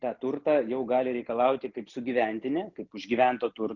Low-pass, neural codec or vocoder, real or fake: 7.2 kHz; none; real